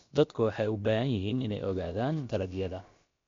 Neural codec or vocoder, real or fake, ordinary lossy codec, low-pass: codec, 16 kHz, about 1 kbps, DyCAST, with the encoder's durations; fake; MP3, 48 kbps; 7.2 kHz